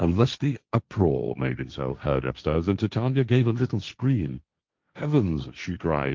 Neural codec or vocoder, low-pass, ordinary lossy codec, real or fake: codec, 16 kHz, 1.1 kbps, Voila-Tokenizer; 7.2 kHz; Opus, 24 kbps; fake